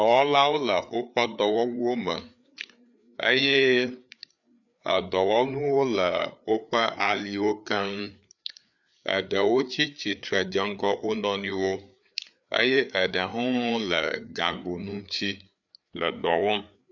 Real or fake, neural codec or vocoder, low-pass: fake; codec, 16 kHz, 4 kbps, FreqCodec, larger model; 7.2 kHz